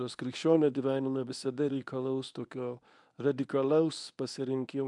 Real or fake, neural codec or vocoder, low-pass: fake; codec, 24 kHz, 0.9 kbps, WavTokenizer, medium speech release version 1; 10.8 kHz